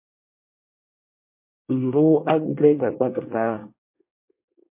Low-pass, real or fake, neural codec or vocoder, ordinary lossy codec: 3.6 kHz; fake; codec, 24 kHz, 1 kbps, SNAC; MP3, 24 kbps